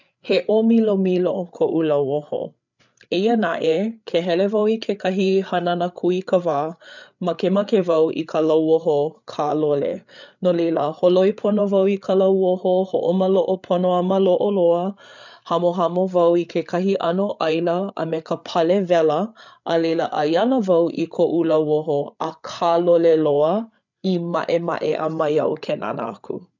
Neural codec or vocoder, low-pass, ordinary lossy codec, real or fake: codec, 16 kHz, 8 kbps, FreqCodec, larger model; 7.2 kHz; none; fake